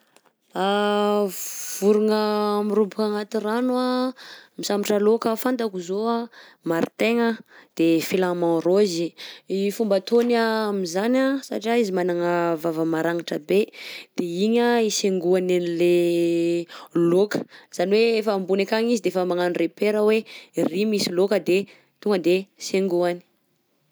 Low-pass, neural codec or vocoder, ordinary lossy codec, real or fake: none; none; none; real